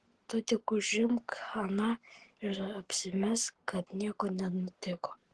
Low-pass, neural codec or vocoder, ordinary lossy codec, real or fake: 10.8 kHz; codec, 44.1 kHz, 7.8 kbps, Pupu-Codec; Opus, 16 kbps; fake